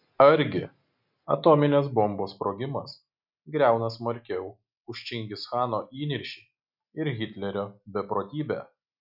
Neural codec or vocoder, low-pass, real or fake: none; 5.4 kHz; real